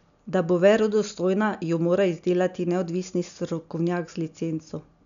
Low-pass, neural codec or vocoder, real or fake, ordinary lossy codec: 7.2 kHz; none; real; none